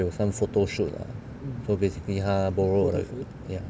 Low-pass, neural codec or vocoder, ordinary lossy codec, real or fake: none; none; none; real